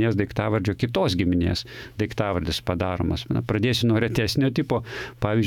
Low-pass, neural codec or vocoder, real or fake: 19.8 kHz; autoencoder, 48 kHz, 128 numbers a frame, DAC-VAE, trained on Japanese speech; fake